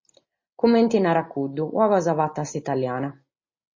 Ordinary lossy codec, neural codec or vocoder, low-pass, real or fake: MP3, 32 kbps; none; 7.2 kHz; real